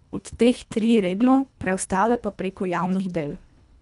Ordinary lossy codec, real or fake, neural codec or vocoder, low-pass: none; fake; codec, 24 kHz, 1.5 kbps, HILCodec; 10.8 kHz